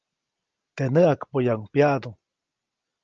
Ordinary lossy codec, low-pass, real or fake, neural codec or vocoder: Opus, 24 kbps; 7.2 kHz; real; none